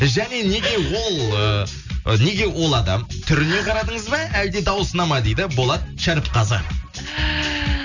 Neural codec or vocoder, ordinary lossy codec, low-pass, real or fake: none; none; 7.2 kHz; real